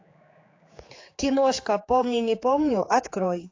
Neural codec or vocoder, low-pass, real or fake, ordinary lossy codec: codec, 16 kHz, 4 kbps, X-Codec, HuBERT features, trained on general audio; 7.2 kHz; fake; AAC, 32 kbps